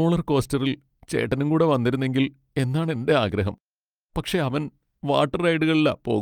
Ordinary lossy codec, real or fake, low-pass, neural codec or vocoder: Opus, 32 kbps; real; 19.8 kHz; none